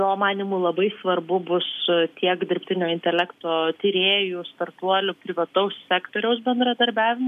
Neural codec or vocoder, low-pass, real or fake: none; 14.4 kHz; real